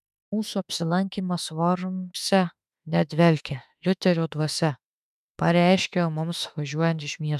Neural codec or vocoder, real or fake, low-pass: autoencoder, 48 kHz, 32 numbers a frame, DAC-VAE, trained on Japanese speech; fake; 14.4 kHz